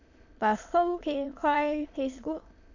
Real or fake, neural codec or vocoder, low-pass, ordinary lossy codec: fake; autoencoder, 22.05 kHz, a latent of 192 numbers a frame, VITS, trained on many speakers; 7.2 kHz; none